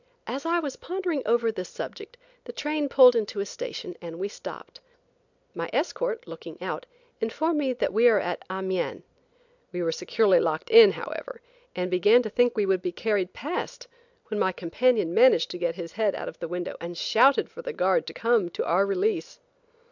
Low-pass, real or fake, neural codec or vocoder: 7.2 kHz; real; none